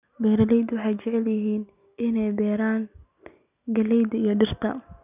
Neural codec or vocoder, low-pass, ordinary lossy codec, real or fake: none; 3.6 kHz; none; real